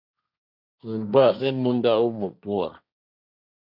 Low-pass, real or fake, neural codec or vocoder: 5.4 kHz; fake; codec, 16 kHz, 1.1 kbps, Voila-Tokenizer